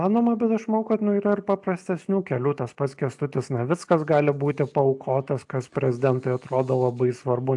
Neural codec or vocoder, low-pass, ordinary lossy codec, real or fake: none; 10.8 kHz; AAC, 64 kbps; real